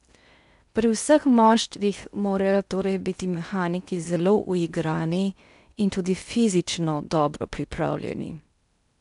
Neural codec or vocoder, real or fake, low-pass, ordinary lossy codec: codec, 16 kHz in and 24 kHz out, 0.6 kbps, FocalCodec, streaming, 4096 codes; fake; 10.8 kHz; none